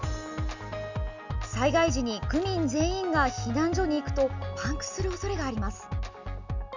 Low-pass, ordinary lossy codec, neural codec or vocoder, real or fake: 7.2 kHz; none; none; real